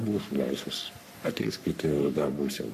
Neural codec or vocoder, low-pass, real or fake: codec, 44.1 kHz, 3.4 kbps, Pupu-Codec; 14.4 kHz; fake